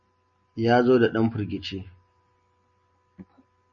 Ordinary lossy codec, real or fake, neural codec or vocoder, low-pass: MP3, 32 kbps; real; none; 7.2 kHz